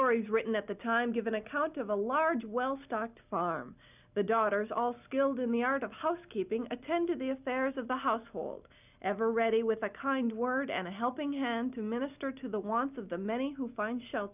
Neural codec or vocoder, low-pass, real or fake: none; 3.6 kHz; real